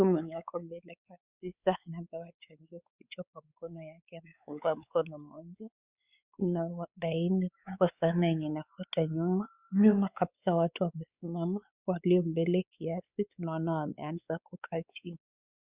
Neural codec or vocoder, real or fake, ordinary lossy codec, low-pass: codec, 16 kHz, 4 kbps, X-Codec, WavLM features, trained on Multilingual LibriSpeech; fake; Opus, 64 kbps; 3.6 kHz